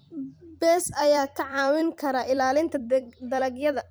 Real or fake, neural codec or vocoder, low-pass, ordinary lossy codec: fake; vocoder, 44.1 kHz, 128 mel bands every 256 samples, BigVGAN v2; none; none